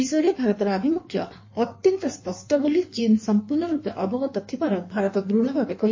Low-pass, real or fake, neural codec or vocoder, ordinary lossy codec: 7.2 kHz; fake; codec, 16 kHz in and 24 kHz out, 1.1 kbps, FireRedTTS-2 codec; MP3, 32 kbps